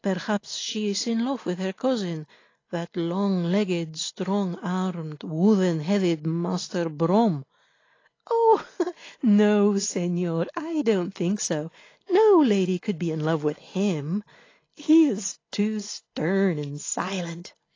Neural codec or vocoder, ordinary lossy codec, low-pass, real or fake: none; AAC, 32 kbps; 7.2 kHz; real